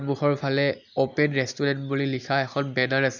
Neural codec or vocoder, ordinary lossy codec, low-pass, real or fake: none; none; 7.2 kHz; real